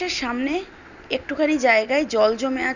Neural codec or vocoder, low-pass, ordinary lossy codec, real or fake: none; 7.2 kHz; none; real